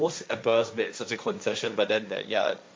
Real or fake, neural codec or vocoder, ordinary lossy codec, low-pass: fake; codec, 16 kHz, 1.1 kbps, Voila-Tokenizer; none; none